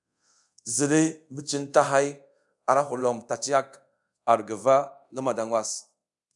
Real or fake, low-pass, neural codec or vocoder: fake; 10.8 kHz; codec, 24 kHz, 0.5 kbps, DualCodec